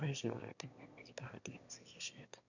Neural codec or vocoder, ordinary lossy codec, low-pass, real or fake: autoencoder, 22.05 kHz, a latent of 192 numbers a frame, VITS, trained on one speaker; none; 7.2 kHz; fake